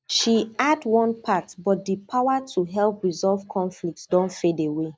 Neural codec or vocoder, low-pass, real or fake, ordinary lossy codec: none; none; real; none